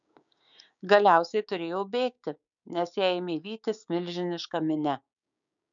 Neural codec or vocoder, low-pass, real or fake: codec, 16 kHz, 6 kbps, DAC; 7.2 kHz; fake